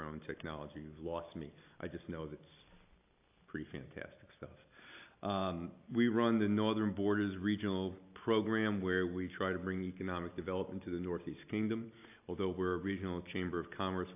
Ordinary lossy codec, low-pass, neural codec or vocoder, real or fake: AAC, 32 kbps; 3.6 kHz; none; real